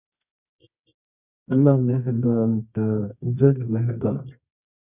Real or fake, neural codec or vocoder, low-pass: fake; codec, 24 kHz, 0.9 kbps, WavTokenizer, medium music audio release; 3.6 kHz